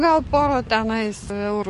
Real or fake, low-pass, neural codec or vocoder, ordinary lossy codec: fake; 14.4 kHz; codec, 44.1 kHz, 7.8 kbps, DAC; MP3, 48 kbps